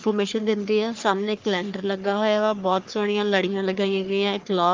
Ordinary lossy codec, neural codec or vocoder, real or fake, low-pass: Opus, 24 kbps; codec, 44.1 kHz, 3.4 kbps, Pupu-Codec; fake; 7.2 kHz